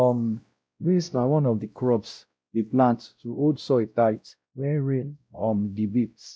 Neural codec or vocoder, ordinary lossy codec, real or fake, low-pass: codec, 16 kHz, 0.5 kbps, X-Codec, WavLM features, trained on Multilingual LibriSpeech; none; fake; none